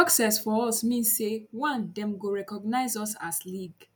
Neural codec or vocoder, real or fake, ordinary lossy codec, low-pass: none; real; none; none